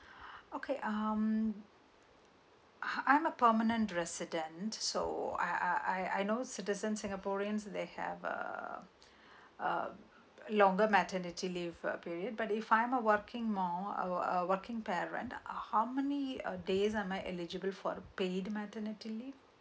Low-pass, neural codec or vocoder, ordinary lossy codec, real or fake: none; none; none; real